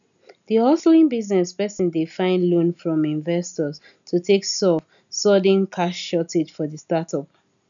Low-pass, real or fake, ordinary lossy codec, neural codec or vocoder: 7.2 kHz; real; none; none